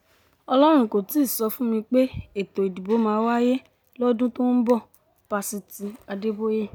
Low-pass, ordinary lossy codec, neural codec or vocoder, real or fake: none; none; none; real